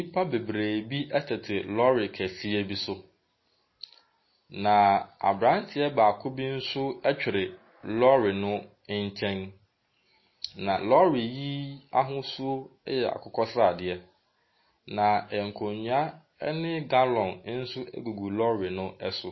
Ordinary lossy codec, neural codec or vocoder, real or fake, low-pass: MP3, 24 kbps; none; real; 7.2 kHz